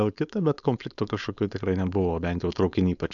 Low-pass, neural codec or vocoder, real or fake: 7.2 kHz; codec, 16 kHz, 4.8 kbps, FACodec; fake